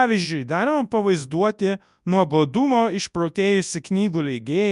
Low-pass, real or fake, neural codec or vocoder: 10.8 kHz; fake; codec, 24 kHz, 0.9 kbps, WavTokenizer, large speech release